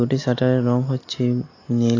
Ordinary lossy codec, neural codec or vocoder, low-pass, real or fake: AAC, 32 kbps; none; 7.2 kHz; real